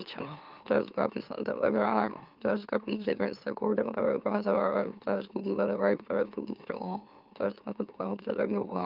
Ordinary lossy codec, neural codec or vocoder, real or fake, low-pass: Opus, 24 kbps; autoencoder, 44.1 kHz, a latent of 192 numbers a frame, MeloTTS; fake; 5.4 kHz